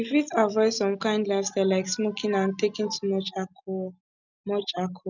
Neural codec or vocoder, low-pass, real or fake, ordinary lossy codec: none; 7.2 kHz; real; none